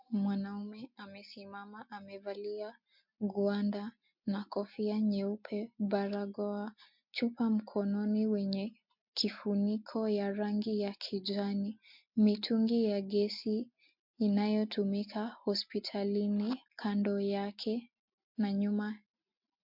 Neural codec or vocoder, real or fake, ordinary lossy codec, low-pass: none; real; MP3, 48 kbps; 5.4 kHz